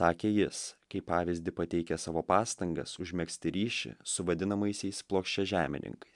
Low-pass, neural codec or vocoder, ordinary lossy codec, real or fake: 10.8 kHz; none; MP3, 96 kbps; real